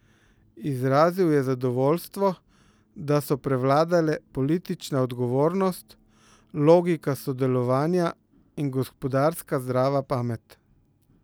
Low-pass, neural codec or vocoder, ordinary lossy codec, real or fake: none; none; none; real